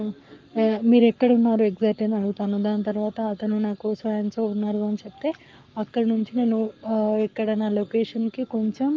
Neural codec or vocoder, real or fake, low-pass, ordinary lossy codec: codec, 44.1 kHz, 7.8 kbps, Pupu-Codec; fake; 7.2 kHz; Opus, 24 kbps